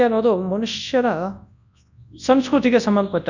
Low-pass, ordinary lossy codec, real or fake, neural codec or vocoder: 7.2 kHz; none; fake; codec, 24 kHz, 0.9 kbps, WavTokenizer, large speech release